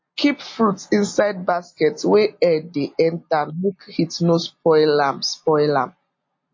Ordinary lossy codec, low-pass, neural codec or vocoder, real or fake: MP3, 32 kbps; 7.2 kHz; none; real